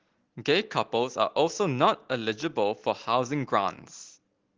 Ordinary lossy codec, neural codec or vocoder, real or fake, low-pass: Opus, 16 kbps; none; real; 7.2 kHz